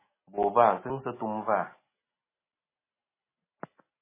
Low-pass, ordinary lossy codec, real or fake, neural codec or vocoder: 3.6 kHz; MP3, 16 kbps; real; none